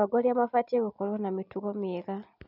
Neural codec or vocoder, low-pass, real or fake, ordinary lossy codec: none; 5.4 kHz; real; none